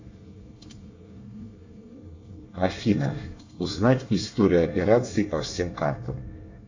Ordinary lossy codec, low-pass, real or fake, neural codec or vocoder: AAC, 48 kbps; 7.2 kHz; fake; codec, 24 kHz, 1 kbps, SNAC